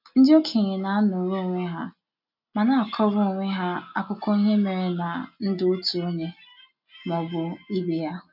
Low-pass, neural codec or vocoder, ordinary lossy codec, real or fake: 5.4 kHz; none; none; real